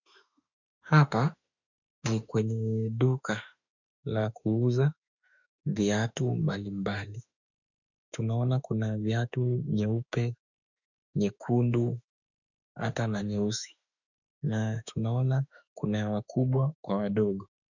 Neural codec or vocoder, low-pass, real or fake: autoencoder, 48 kHz, 32 numbers a frame, DAC-VAE, trained on Japanese speech; 7.2 kHz; fake